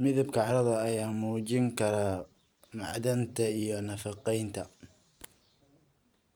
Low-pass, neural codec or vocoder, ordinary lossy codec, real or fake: none; vocoder, 44.1 kHz, 128 mel bands every 512 samples, BigVGAN v2; none; fake